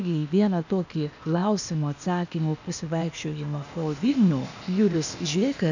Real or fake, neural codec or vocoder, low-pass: fake; codec, 16 kHz, 0.8 kbps, ZipCodec; 7.2 kHz